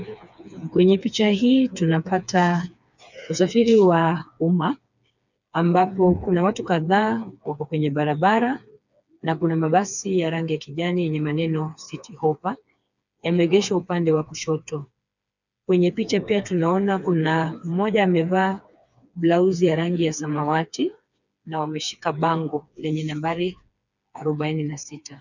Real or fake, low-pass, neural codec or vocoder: fake; 7.2 kHz; codec, 16 kHz, 4 kbps, FreqCodec, smaller model